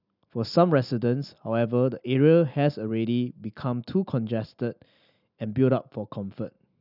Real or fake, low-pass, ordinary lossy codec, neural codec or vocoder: real; 5.4 kHz; none; none